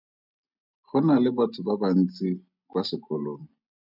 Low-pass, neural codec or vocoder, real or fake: 5.4 kHz; none; real